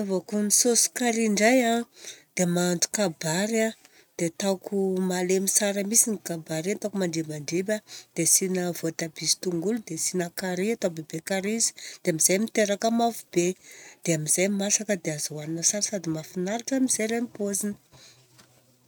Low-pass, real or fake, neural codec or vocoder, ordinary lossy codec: none; real; none; none